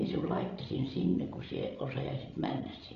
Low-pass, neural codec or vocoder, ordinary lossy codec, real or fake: 7.2 kHz; codec, 16 kHz, 16 kbps, FreqCodec, larger model; none; fake